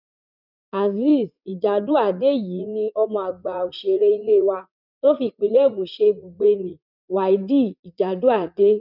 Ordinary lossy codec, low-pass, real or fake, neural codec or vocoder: none; 5.4 kHz; fake; vocoder, 44.1 kHz, 80 mel bands, Vocos